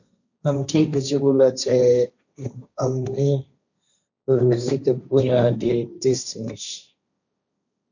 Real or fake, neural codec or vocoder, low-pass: fake; codec, 16 kHz, 1.1 kbps, Voila-Tokenizer; 7.2 kHz